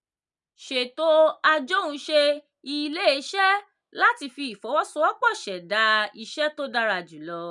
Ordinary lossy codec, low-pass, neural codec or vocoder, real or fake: none; 10.8 kHz; none; real